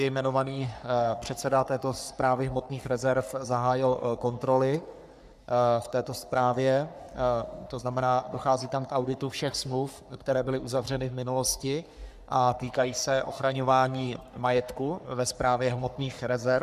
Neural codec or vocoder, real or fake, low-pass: codec, 44.1 kHz, 3.4 kbps, Pupu-Codec; fake; 14.4 kHz